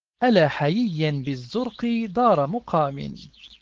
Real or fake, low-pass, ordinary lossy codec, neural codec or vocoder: fake; 7.2 kHz; Opus, 16 kbps; codec, 16 kHz, 4.8 kbps, FACodec